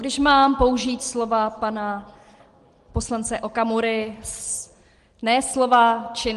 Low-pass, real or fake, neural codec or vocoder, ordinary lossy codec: 10.8 kHz; real; none; Opus, 24 kbps